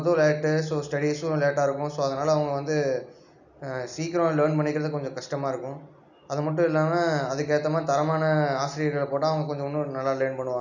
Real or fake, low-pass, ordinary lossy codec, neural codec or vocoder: real; 7.2 kHz; none; none